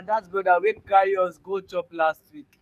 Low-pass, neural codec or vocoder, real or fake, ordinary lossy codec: 14.4 kHz; codec, 44.1 kHz, 7.8 kbps, DAC; fake; none